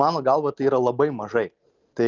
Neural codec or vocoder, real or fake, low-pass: none; real; 7.2 kHz